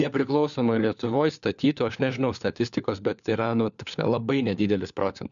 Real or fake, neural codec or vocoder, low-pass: fake; codec, 16 kHz, 4 kbps, FunCodec, trained on LibriTTS, 50 frames a second; 7.2 kHz